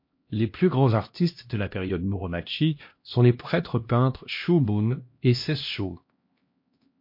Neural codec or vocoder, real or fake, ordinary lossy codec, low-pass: codec, 16 kHz, 1 kbps, X-Codec, HuBERT features, trained on LibriSpeech; fake; MP3, 32 kbps; 5.4 kHz